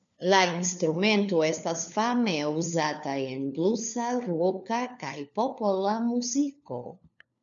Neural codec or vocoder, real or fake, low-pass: codec, 16 kHz, 4 kbps, FunCodec, trained on LibriTTS, 50 frames a second; fake; 7.2 kHz